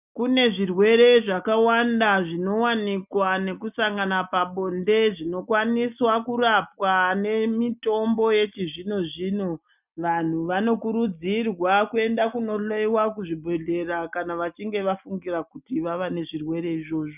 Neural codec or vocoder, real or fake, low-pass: none; real; 3.6 kHz